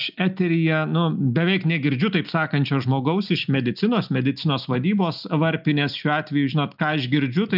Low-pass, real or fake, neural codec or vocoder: 5.4 kHz; real; none